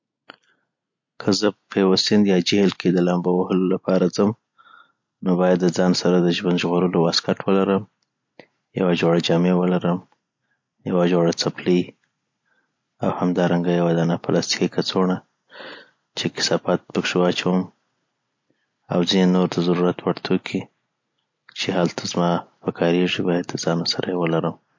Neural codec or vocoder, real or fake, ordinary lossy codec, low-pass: none; real; MP3, 48 kbps; 7.2 kHz